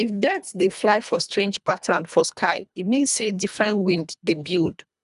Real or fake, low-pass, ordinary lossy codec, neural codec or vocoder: fake; 10.8 kHz; none; codec, 24 kHz, 1.5 kbps, HILCodec